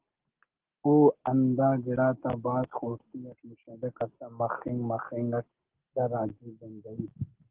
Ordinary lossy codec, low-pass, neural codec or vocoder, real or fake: Opus, 16 kbps; 3.6 kHz; none; real